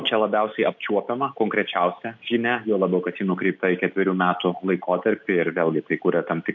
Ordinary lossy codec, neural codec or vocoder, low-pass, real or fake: MP3, 64 kbps; none; 7.2 kHz; real